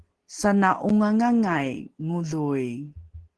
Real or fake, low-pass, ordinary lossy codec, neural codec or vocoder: real; 10.8 kHz; Opus, 16 kbps; none